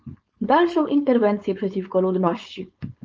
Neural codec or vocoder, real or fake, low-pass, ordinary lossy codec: codec, 16 kHz, 4.8 kbps, FACodec; fake; 7.2 kHz; Opus, 24 kbps